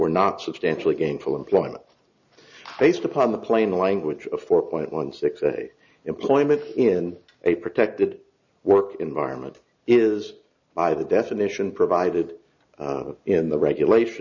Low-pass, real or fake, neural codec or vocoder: 7.2 kHz; real; none